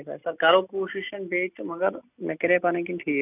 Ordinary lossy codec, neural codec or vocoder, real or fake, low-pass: none; none; real; 3.6 kHz